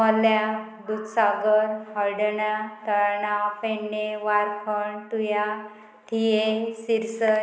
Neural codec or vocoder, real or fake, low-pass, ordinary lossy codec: none; real; none; none